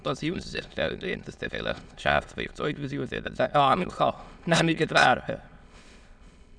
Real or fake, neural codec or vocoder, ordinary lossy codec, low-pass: fake; autoencoder, 22.05 kHz, a latent of 192 numbers a frame, VITS, trained on many speakers; none; 9.9 kHz